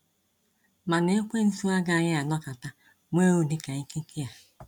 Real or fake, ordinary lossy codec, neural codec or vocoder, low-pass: real; none; none; 19.8 kHz